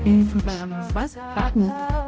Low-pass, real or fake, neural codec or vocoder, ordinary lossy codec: none; fake; codec, 16 kHz, 0.5 kbps, X-Codec, HuBERT features, trained on balanced general audio; none